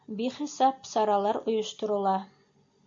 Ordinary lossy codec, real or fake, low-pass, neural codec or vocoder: MP3, 48 kbps; real; 7.2 kHz; none